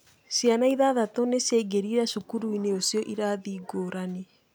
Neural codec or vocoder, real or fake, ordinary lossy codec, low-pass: none; real; none; none